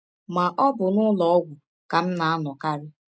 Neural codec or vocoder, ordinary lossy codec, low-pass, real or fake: none; none; none; real